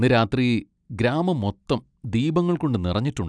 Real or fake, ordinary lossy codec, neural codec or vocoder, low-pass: real; none; none; 9.9 kHz